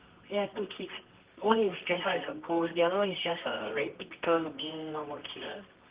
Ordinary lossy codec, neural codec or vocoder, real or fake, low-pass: Opus, 32 kbps; codec, 24 kHz, 0.9 kbps, WavTokenizer, medium music audio release; fake; 3.6 kHz